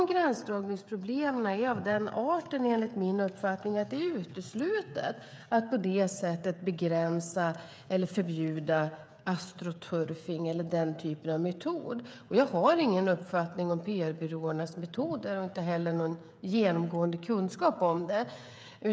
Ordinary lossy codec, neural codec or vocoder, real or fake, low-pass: none; codec, 16 kHz, 16 kbps, FreqCodec, smaller model; fake; none